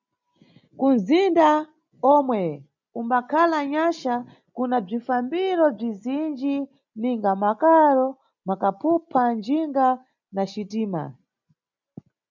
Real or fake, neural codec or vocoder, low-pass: real; none; 7.2 kHz